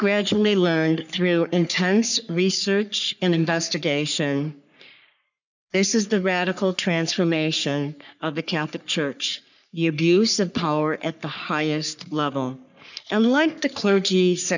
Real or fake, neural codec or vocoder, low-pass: fake; codec, 44.1 kHz, 3.4 kbps, Pupu-Codec; 7.2 kHz